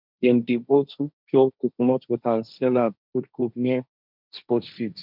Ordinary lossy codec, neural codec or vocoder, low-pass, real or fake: none; codec, 16 kHz, 1.1 kbps, Voila-Tokenizer; 5.4 kHz; fake